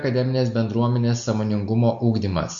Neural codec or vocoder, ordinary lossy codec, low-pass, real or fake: none; MP3, 48 kbps; 7.2 kHz; real